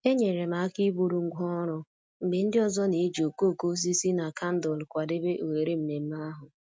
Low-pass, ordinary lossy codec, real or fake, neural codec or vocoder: none; none; real; none